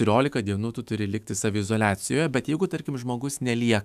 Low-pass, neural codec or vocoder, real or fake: 14.4 kHz; autoencoder, 48 kHz, 128 numbers a frame, DAC-VAE, trained on Japanese speech; fake